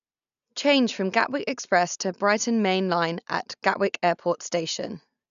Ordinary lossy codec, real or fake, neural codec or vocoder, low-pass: none; real; none; 7.2 kHz